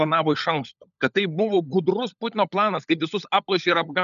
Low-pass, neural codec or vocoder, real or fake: 7.2 kHz; codec, 16 kHz, 16 kbps, FunCodec, trained on LibriTTS, 50 frames a second; fake